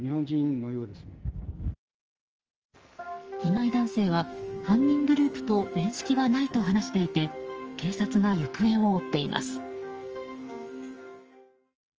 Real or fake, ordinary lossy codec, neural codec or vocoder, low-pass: fake; Opus, 24 kbps; codec, 44.1 kHz, 2.6 kbps, SNAC; 7.2 kHz